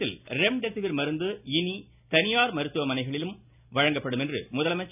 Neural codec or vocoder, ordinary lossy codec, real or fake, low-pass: none; none; real; 3.6 kHz